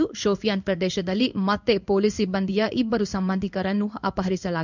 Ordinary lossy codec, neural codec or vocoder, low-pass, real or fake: none; codec, 16 kHz in and 24 kHz out, 1 kbps, XY-Tokenizer; 7.2 kHz; fake